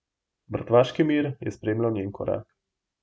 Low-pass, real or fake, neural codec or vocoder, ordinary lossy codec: none; real; none; none